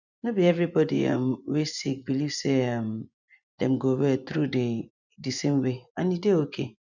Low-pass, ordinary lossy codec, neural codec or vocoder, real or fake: 7.2 kHz; none; vocoder, 24 kHz, 100 mel bands, Vocos; fake